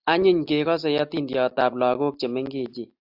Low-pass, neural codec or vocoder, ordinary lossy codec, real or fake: 5.4 kHz; none; AAC, 48 kbps; real